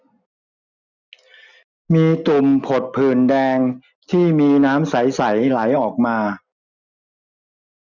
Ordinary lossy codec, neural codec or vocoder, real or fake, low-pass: none; none; real; 7.2 kHz